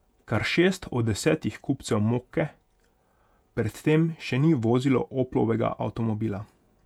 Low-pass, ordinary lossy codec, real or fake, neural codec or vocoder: 19.8 kHz; none; fake; vocoder, 48 kHz, 128 mel bands, Vocos